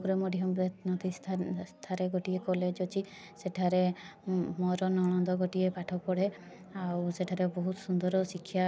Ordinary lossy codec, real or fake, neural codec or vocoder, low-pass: none; real; none; none